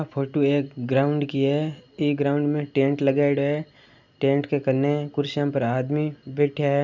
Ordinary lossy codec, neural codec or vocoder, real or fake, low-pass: none; none; real; 7.2 kHz